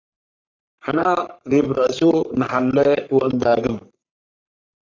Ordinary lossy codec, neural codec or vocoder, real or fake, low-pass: AAC, 48 kbps; codec, 44.1 kHz, 3.4 kbps, Pupu-Codec; fake; 7.2 kHz